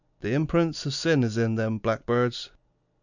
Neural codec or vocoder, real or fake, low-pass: none; real; 7.2 kHz